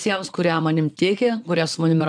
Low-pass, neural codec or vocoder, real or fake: 9.9 kHz; vocoder, 22.05 kHz, 80 mel bands, WaveNeXt; fake